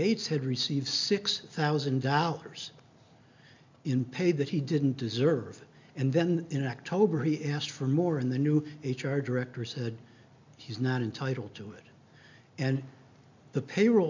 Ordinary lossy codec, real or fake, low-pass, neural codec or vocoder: MP3, 64 kbps; real; 7.2 kHz; none